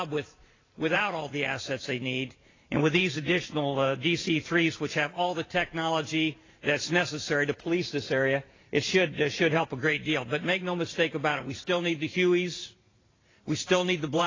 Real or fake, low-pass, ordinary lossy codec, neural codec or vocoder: fake; 7.2 kHz; AAC, 32 kbps; vocoder, 44.1 kHz, 128 mel bands every 512 samples, BigVGAN v2